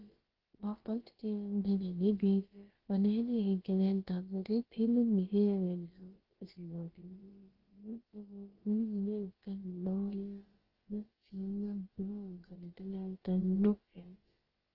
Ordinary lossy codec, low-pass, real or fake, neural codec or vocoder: Opus, 16 kbps; 5.4 kHz; fake; codec, 16 kHz, about 1 kbps, DyCAST, with the encoder's durations